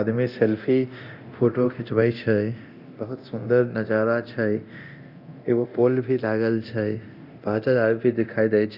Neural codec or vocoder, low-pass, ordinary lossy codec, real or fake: codec, 24 kHz, 0.9 kbps, DualCodec; 5.4 kHz; Opus, 64 kbps; fake